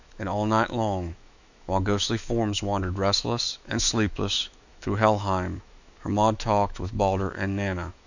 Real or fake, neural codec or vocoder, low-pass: fake; autoencoder, 48 kHz, 128 numbers a frame, DAC-VAE, trained on Japanese speech; 7.2 kHz